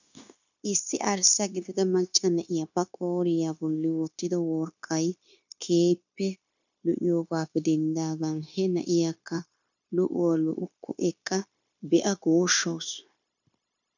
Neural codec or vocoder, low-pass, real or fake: codec, 16 kHz, 0.9 kbps, LongCat-Audio-Codec; 7.2 kHz; fake